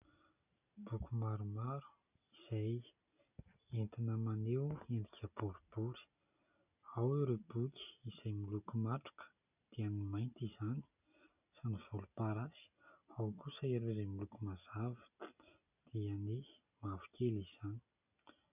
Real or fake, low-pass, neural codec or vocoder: real; 3.6 kHz; none